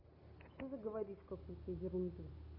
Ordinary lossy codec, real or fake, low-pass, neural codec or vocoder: none; real; 5.4 kHz; none